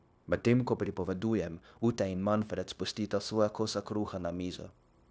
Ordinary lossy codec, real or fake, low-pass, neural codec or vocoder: none; fake; none; codec, 16 kHz, 0.9 kbps, LongCat-Audio-Codec